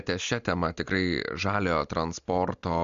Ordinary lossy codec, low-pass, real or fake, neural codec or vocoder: MP3, 64 kbps; 7.2 kHz; real; none